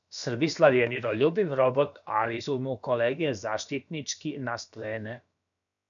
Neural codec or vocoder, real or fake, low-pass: codec, 16 kHz, about 1 kbps, DyCAST, with the encoder's durations; fake; 7.2 kHz